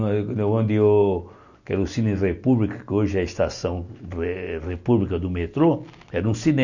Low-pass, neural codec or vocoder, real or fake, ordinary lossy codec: 7.2 kHz; none; real; none